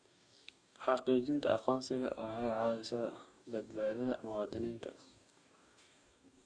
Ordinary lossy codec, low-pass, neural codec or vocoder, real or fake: none; 9.9 kHz; codec, 44.1 kHz, 2.6 kbps, DAC; fake